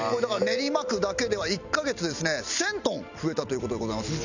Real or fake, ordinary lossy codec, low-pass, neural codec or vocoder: real; none; 7.2 kHz; none